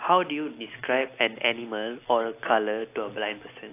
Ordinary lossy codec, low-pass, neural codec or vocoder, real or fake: AAC, 24 kbps; 3.6 kHz; none; real